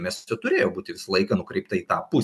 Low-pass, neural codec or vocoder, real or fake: 14.4 kHz; none; real